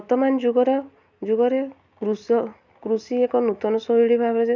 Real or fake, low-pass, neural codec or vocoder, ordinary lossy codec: real; 7.2 kHz; none; none